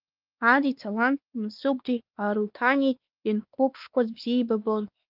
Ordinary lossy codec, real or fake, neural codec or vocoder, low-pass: Opus, 32 kbps; fake; codec, 16 kHz, 2 kbps, X-Codec, WavLM features, trained on Multilingual LibriSpeech; 5.4 kHz